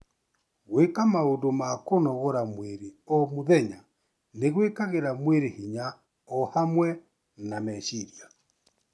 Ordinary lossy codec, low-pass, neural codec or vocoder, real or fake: none; none; none; real